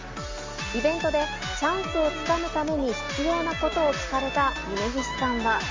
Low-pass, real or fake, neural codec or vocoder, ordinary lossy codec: 7.2 kHz; real; none; Opus, 32 kbps